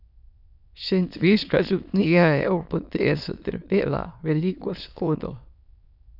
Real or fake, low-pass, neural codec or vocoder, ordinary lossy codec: fake; 5.4 kHz; autoencoder, 22.05 kHz, a latent of 192 numbers a frame, VITS, trained on many speakers; none